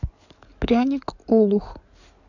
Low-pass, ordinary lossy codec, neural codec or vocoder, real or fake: 7.2 kHz; none; codec, 16 kHz in and 24 kHz out, 2.2 kbps, FireRedTTS-2 codec; fake